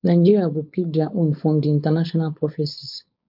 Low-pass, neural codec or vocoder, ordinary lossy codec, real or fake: 5.4 kHz; codec, 16 kHz, 4.8 kbps, FACodec; MP3, 48 kbps; fake